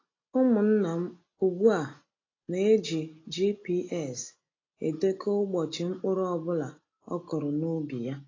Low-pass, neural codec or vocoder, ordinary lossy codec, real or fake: 7.2 kHz; none; AAC, 32 kbps; real